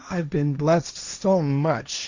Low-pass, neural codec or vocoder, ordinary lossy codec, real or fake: 7.2 kHz; codec, 16 kHz, 1.1 kbps, Voila-Tokenizer; Opus, 64 kbps; fake